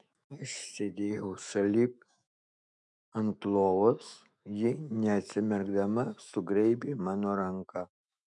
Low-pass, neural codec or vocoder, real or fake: 10.8 kHz; autoencoder, 48 kHz, 128 numbers a frame, DAC-VAE, trained on Japanese speech; fake